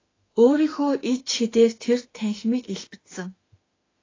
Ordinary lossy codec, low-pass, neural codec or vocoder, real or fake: AAC, 32 kbps; 7.2 kHz; autoencoder, 48 kHz, 32 numbers a frame, DAC-VAE, trained on Japanese speech; fake